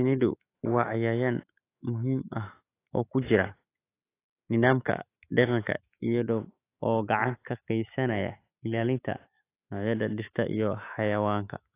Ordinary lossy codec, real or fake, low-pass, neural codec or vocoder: AAC, 24 kbps; fake; 3.6 kHz; autoencoder, 48 kHz, 128 numbers a frame, DAC-VAE, trained on Japanese speech